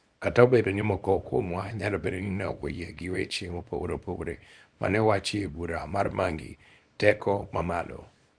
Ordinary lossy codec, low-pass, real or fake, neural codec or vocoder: Opus, 64 kbps; 9.9 kHz; fake; codec, 24 kHz, 0.9 kbps, WavTokenizer, small release